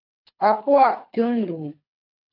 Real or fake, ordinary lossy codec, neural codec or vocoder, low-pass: fake; AAC, 32 kbps; codec, 24 kHz, 3 kbps, HILCodec; 5.4 kHz